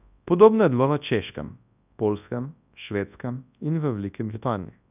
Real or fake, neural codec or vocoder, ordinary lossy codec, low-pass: fake; codec, 24 kHz, 0.9 kbps, WavTokenizer, large speech release; none; 3.6 kHz